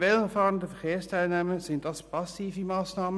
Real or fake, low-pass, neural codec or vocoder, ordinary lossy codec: real; none; none; none